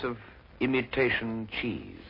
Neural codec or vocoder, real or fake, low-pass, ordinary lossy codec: none; real; 5.4 kHz; MP3, 24 kbps